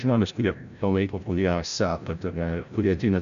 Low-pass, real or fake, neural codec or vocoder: 7.2 kHz; fake; codec, 16 kHz, 0.5 kbps, FreqCodec, larger model